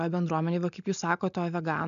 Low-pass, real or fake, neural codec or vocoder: 7.2 kHz; real; none